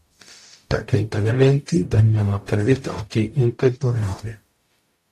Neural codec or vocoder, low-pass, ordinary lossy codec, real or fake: codec, 44.1 kHz, 0.9 kbps, DAC; 14.4 kHz; AAC, 64 kbps; fake